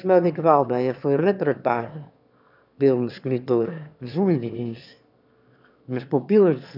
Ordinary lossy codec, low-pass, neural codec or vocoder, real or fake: none; 5.4 kHz; autoencoder, 22.05 kHz, a latent of 192 numbers a frame, VITS, trained on one speaker; fake